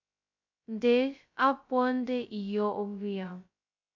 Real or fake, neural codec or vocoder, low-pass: fake; codec, 16 kHz, 0.2 kbps, FocalCodec; 7.2 kHz